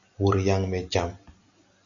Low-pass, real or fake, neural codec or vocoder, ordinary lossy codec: 7.2 kHz; real; none; MP3, 64 kbps